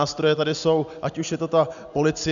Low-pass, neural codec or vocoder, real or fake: 7.2 kHz; none; real